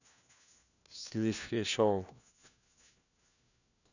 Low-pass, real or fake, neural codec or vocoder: 7.2 kHz; fake; codec, 16 kHz, 1 kbps, FunCodec, trained on LibriTTS, 50 frames a second